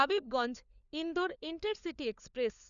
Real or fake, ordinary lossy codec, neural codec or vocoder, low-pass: fake; none; codec, 16 kHz, 4 kbps, FunCodec, trained on LibriTTS, 50 frames a second; 7.2 kHz